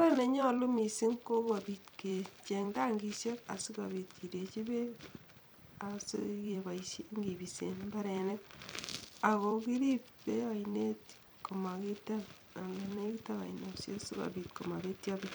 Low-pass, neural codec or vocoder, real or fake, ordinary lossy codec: none; vocoder, 44.1 kHz, 128 mel bands every 256 samples, BigVGAN v2; fake; none